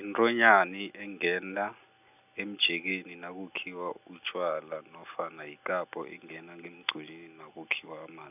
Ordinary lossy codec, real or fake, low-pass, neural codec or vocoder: none; real; 3.6 kHz; none